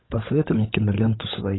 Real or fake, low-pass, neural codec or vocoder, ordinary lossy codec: fake; 7.2 kHz; codec, 16 kHz, 16 kbps, FunCodec, trained on LibriTTS, 50 frames a second; AAC, 16 kbps